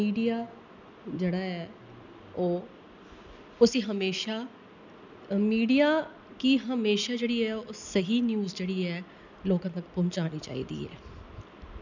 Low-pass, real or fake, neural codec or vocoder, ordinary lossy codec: 7.2 kHz; real; none; none